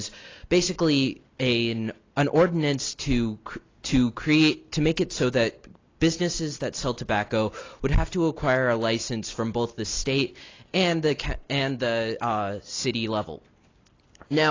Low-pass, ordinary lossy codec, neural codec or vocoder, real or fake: 7.2 kHz; AAC, 32 kbps; none; real